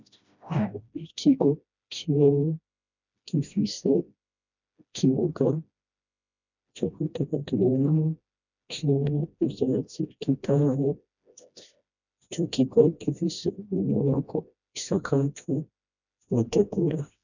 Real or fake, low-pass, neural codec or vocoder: fake; 7.2 kHz; codec, 16 kHz, 1 kbps, FreqCodec, smaller model